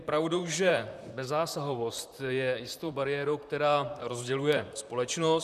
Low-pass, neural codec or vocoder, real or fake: 14.4 kHz; vocoder, 44.1 kHz, 128 mel bands, Pupu-Vocoder; fake